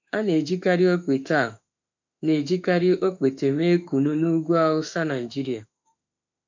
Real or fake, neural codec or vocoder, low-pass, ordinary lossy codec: fake; autoencoder, 48 kHz, 32 numbers a frame, DAC-VAE, trained on Japanese speech; 7.2 kHz; MP3, 64 kbps